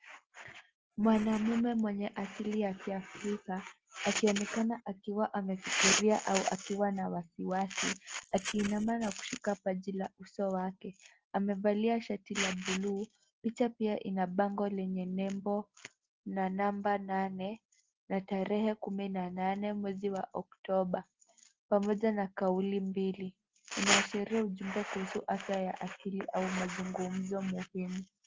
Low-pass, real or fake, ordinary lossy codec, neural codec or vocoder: 7.2 kHz; real; Opus, 16 kbps; none